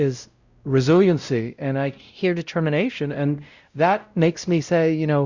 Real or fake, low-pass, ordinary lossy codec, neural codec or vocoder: fake; 7.2 kHz; Opus, 64 kbps; codec, 16 kHz, 0.5 kbps, X-Codec, WavLM features, trained on Multilingual LibriSpeech